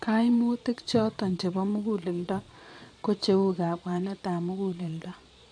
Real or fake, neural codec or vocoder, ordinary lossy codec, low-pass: fake; vocoder, 22.05 kHz, 80 mel bands, Vocos; MP3, 64 kbps; 9.9 kHz